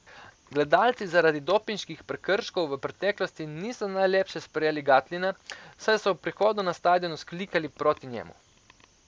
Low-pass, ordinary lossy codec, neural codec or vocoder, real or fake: none; none; none; real